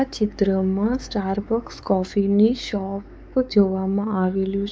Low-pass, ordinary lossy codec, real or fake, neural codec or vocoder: none; none; fake; codec, 16 kHz, 4 kbps, X-Codec, WavLM features, trained on Multilingual LibriSpeech